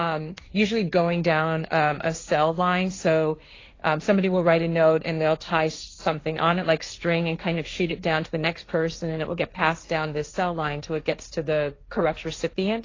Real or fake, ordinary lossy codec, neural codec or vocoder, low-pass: fake; AAC, 32 kbps; codec, 16 kHz, 1.1 kbps, Voila-Tokenizer; 7.2 kHz